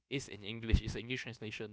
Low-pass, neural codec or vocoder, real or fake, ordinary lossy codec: none; codec, 16 kHz, about 1 kbps, DyCAST, with the encoder's durations; fake; none